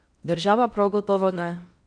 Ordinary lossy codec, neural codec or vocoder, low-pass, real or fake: none; codec, 16 kHz in and 24 kHz out, 0.6 kbps, FocalCodec, streaming, 4096 codes; 9.9 kHz; fake